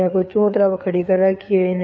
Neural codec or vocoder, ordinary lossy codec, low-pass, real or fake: codec, 16 kHz, 4 kbps, FreqCodec, larger model; none; none; fake